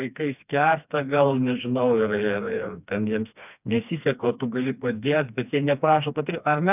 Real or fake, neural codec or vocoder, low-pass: fake; codec, 16 kHz, 2 kbps, FreqCodec, smaller model; 3.6 kHz